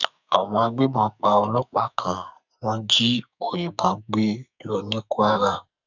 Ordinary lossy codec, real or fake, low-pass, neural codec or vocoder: none; fake; 7.2 kHz; codec, 44.1 kHz, 2.6 kbps, SNAC